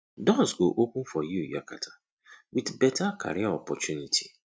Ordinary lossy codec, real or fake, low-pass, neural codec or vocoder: none; real; none; none